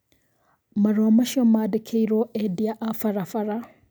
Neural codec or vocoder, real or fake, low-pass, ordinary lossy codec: none; real; none; none